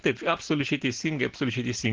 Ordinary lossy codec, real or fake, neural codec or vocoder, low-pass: Opus, 16 kbps; real; none; 7.2 kHz